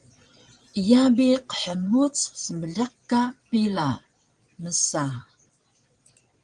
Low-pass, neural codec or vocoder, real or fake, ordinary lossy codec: 9.9 kHz; vocoder, 22.05 kHz, 80 mel bands, Vocos; fake; Opus, 24 kbps